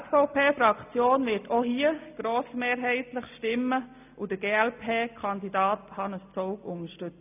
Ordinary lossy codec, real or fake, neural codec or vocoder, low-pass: none; real; none; 3.6 kHz